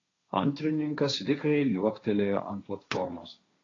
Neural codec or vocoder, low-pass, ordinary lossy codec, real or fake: codec, 16 kHz, 1.1 kbps, Voila-Tokenizer; 7.2 kHz; AAC, 32 kbps; fake